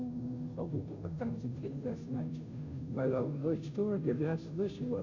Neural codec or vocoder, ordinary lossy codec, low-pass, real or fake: codec, 16 kHz, 0.5 kbps, FunCodec, trained on Chinese and English, 25 frames a second; none; 7.2 kHz; fake